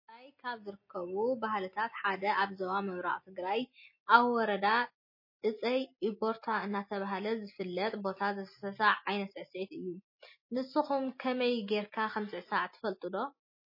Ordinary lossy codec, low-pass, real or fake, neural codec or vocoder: MP3, 24 kbps; 5.4 kHz; real; none